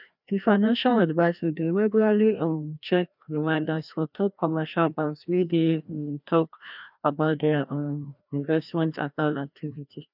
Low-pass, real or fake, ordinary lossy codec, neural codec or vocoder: 5.4 kHz; fake; none; codec, 16 kHz, 1 kbps, FreqCodec, larger model